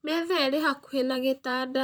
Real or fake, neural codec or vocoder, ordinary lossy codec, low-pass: fake; vocoder, 44.1 kHz, 128 mel bands, Pupu-Vocoder; none; none